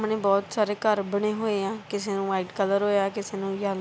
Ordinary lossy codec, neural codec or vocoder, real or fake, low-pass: none; none; real; none